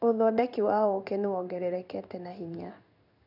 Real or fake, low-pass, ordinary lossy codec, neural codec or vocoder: fake; 5.4 kHz; none; codec, 16 kHz in and 24 kHz out, 1 kbps, XY-Tokenizer